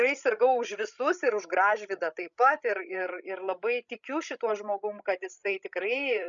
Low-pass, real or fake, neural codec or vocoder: 7.2 kHz; fake; codec, 16 kHz, 16 kbps, FreqCodec, larger model